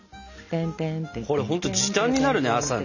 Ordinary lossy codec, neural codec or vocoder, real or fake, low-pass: none; none; real; 7.2 kHz